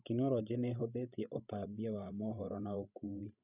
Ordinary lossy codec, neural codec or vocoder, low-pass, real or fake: none; codec, 16 kHz, 16 kbps, FreqCodec, larger model; 3.6 kHz; fake